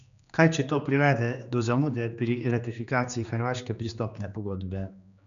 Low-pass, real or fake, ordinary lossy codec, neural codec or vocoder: 7.2 kHz; fake; none; codec, 16 kHz, 2 kbps, X-Codec, HuBERT features, trained on general audio